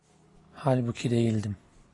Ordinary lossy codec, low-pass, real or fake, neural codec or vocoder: AAC, 32 kbps; 10.8 kHz; real; none